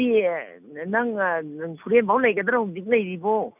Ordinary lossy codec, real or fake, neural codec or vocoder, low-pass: none; real; none; 3.6 kHz